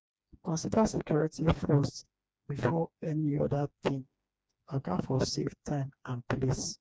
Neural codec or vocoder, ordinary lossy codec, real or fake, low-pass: codec, 16 kHz, 2 kbps, FreqCodec, smaller model; none; fake; none